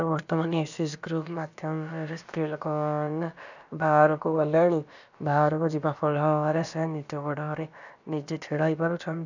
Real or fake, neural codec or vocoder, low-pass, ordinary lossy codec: fake; codec, 16 kHz, about 1 kbps, DyCAST, with the encoder's durations; 7.2 kHz; none